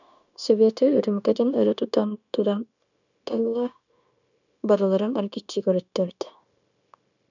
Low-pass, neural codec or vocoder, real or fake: 7.2 kHz; codec, 16 kHz, 0.9 kbps, LongCat-Audio-Codec; fake